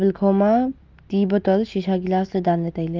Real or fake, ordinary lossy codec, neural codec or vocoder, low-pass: real; Opus, 32 kbps; none; 7.2 kHz